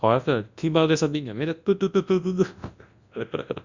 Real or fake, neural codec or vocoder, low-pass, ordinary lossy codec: fake; codec, 24 kHz, 0.9 kbps, WavTokenizer, large speech release; 7.2 kHz; Opus, 64 kbps